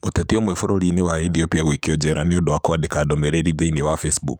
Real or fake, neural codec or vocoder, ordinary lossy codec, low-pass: fake; codec, 44.1 kHz, 7.8 kbps, DAC; none; none